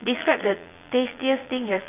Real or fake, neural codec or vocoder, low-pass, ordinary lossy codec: fake; vocoder, 22.05 kHz, 80 mel bands, Vocos; 3.6 kHz; AAC, 24 kbps